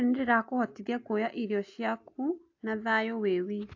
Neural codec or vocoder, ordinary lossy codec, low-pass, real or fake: none; AAC, 48 kbps; 7.2 kHz; real